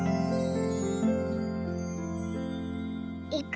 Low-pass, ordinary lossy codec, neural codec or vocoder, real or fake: none; none; none; real